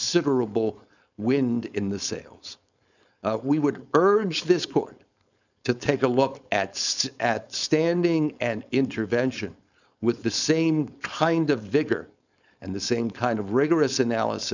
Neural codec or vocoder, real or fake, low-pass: codec, 16 kHz, 4.8 kbps, FACodec; fake; 7.2 kHz